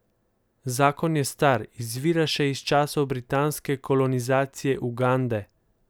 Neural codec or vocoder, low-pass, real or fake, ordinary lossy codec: none; none; real; none